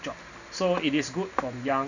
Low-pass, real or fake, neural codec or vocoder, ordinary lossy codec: 7.2 kHz; real; none; none